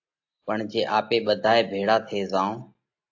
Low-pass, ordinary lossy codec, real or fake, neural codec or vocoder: 7.2 kHz; AAC, 48 kbps; real; none